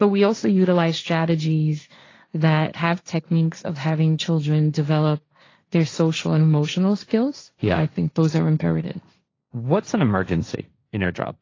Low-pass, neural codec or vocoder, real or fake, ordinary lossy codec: 7.2 kHz; codec, 16 kHz, 1.1 kbps, Voila-Tokenizer; fake; AAC, 32 kbps